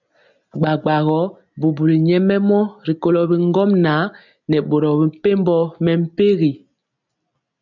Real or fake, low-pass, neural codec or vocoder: real; 7.2 kHz; none